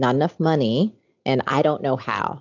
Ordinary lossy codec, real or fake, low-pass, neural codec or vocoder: AAC, 48 kbps; real; 7.2 kHz; none